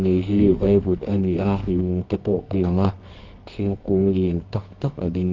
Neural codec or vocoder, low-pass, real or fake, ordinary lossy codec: codec, 24 kHz, 0.9 kbps, WavTokenizer, medium music audio release; 7.2 kHz; fake; Opus, 32 kbps